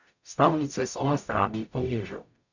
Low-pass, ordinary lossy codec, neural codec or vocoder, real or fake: 7.2 kHz; none; codec, 44.1 kHz, 0.9 kbps, DAC; fake